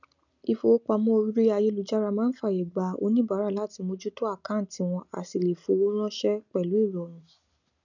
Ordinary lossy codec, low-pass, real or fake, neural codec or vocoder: none; 7.2 kHz; real; none